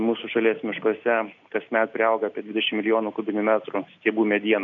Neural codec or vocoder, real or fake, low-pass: none; real; 7.2 kHz